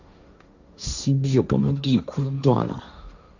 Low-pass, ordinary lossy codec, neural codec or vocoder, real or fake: 7.2 kHz; none; codec, 16 kHz, 1.1 kbps, Voila-Tokenizer; fake